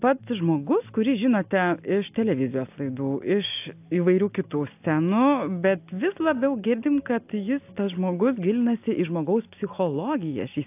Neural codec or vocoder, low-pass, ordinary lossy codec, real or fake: none; 3.6 kHz; AAC, 32 kbps; real